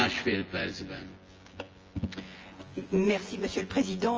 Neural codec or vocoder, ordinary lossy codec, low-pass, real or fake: vocoder, 24 kHz, 100 mel bands, Vocos; Opus, 24 kbps; 7.2 kHz; fake